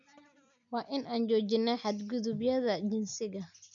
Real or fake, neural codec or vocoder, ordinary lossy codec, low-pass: real; none; none; 7.2 kHz